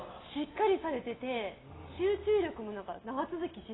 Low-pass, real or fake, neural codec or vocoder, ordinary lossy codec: 7.2 kHz; real; none; AAC, 16 kbps